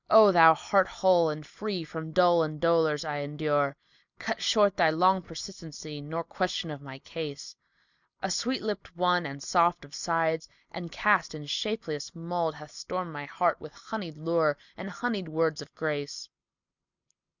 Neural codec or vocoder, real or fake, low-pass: none; real; 7.2 kHz